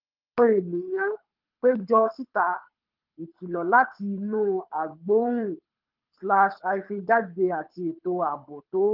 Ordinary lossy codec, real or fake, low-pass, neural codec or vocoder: Opus, 32 kbps; fake; 5.4 kHz; codec, 24 kHz, 6 kbps, HILCodec